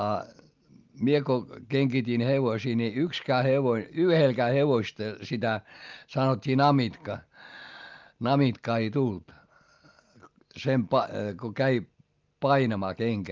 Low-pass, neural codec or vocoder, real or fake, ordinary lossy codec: 7.2 kHz; none; real; Opus, 32 kbps